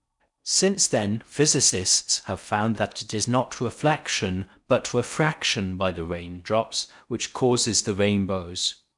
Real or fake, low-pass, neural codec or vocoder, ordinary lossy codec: fake; 10.8 kHz; codec, 16 kHz in and 24 kHz out, 0.6 kbps, FocalCodec, streaming, 4096 codes; none